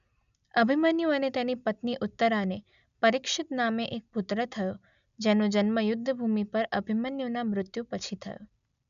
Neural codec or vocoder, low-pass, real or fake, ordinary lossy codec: none; 7.2 kHz; real; none